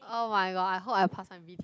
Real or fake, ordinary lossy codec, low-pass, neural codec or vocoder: real; none; none; none